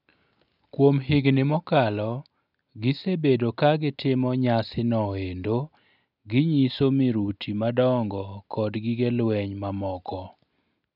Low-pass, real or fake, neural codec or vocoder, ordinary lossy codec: 5.4 kHz; real; none; none